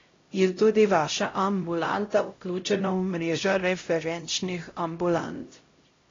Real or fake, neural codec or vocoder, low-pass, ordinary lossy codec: fake; codec, 16 kHz, 0.5 kbps, X-Codec, HuBERT features, trained on LibriSpeech; 7.2 kHz; AAC, 32 kbps